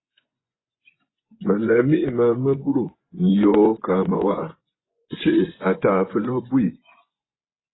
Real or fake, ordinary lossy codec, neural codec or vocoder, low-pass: fake; AAC, 16 kbps; vocoder, 24 kHz, 100 mel bands, Vocos; 7.2 kHz